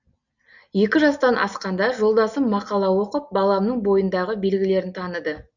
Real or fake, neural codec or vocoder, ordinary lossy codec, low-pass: real; none; none; 7.2 kHz